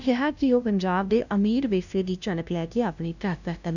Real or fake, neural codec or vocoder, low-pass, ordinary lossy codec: fake; codec, 16 kHz, 0.5 kbps, FunCodec, trained on LibriTTS, 25 frames a second; 7.2 kHz; none